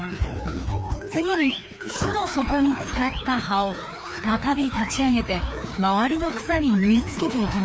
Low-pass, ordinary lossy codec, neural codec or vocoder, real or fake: none; none; codec, 16 kHz, 2 kbps, FreqCodec, larger model; fake